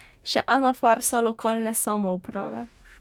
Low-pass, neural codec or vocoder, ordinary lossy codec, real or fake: 19.8 kHz; codec, 44.1 kHz, 2.6 kbps, DAC; none; fake